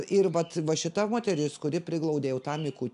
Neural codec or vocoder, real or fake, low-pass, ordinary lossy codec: none; real; 10.8 kHz; AAC, 96 kbps